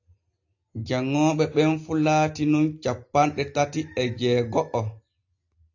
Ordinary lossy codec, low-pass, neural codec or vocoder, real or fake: AAC, 48 kbps; 7.2 kHz; none; real